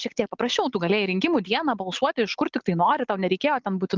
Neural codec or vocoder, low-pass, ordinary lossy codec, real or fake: none; 7.2 kHz; Opus, 32 kbps; real